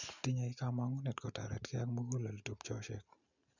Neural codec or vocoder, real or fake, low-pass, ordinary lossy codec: none; real; 7.2 kHz; none